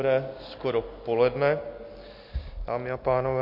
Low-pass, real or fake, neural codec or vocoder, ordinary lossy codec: 5.4 kHz; real; none; MP3, 32 kbps